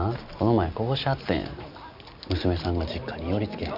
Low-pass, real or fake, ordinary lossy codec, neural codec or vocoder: 5.4 kHz; real; none; none